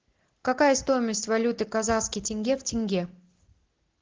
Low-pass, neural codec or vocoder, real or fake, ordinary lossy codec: 7.2 kHz; none; real; Opus, 16 kbps